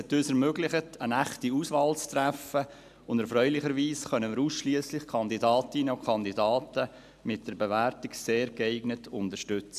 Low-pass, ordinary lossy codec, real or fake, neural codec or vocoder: 14.4 kHz; AAC, 96 kbps; real; none